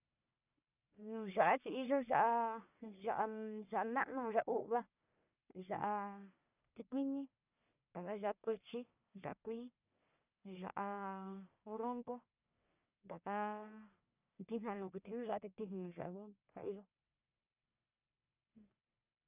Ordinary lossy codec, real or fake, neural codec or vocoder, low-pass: none; fake; codec, 44.1 kHz, 1.7 kbps, Pupu-Codec; 3.6 kHz